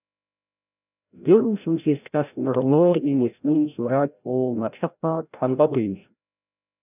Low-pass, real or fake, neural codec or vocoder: 3.6 kHz; fake; codec, 16 kHz, 0.5 kbps, FreqCodec, larger model